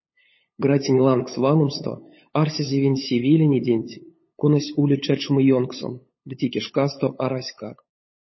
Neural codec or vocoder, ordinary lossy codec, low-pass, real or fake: codec, 16 kHz, 8 kbps, FunCodec, trained on LibriTTS, 25 frames a second; MP3, 24 kbps; 7.2 kHz; fake